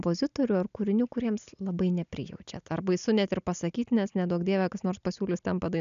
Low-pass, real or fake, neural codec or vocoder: 7.2 kHz; real; none